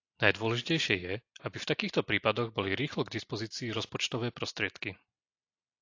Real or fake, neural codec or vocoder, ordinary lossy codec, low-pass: real; none; AAC, 48 kbps; 7.2 kHz